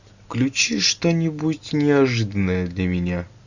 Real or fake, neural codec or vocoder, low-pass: real; none; 7.2 kHz